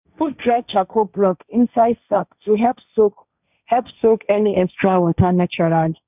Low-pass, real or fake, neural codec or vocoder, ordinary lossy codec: 3.6 kHz; fake; codec, 16 kHz, 1.1 kbps, Voila-Tokenizer; none